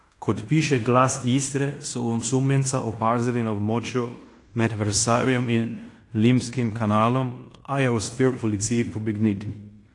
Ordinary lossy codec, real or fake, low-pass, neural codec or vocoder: AAC, 48 kbps; fake; 10.8 kHz; codec, 16 kHz in and 24 kHz out, 0.9 kbps, LongCat-Audio-Codec, fine tuned four codebook decoder